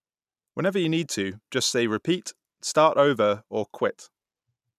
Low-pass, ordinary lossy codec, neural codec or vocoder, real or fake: 14.4 kHz; none; none; real